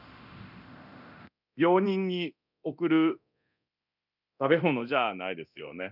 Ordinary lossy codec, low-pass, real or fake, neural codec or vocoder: none; 5.4 kHz; fake; codec, 24 kHz, 0.9 kbps, DualCodec